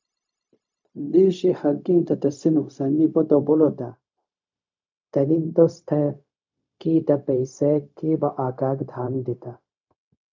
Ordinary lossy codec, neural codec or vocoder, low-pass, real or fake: MP3, 64 kbps; codec, 16 kHz, 0.4 kbps, LongCat-Audio-Codec; 7.2 kHz; fake